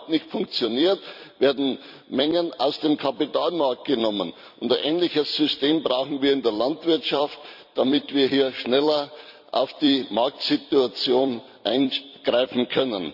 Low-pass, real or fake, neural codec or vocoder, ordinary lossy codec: 5.4 kHz; fake; vocoder, 44.1 kHz, 128 mel bands every 256 samples, BigVGAN v2; none